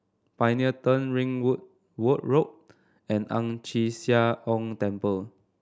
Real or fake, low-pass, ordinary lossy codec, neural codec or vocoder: real; none; none; none